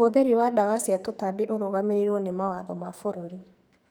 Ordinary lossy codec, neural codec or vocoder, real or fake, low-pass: none; codec, 44.1 kHz, 2.6 kbps, SNAC; fake; none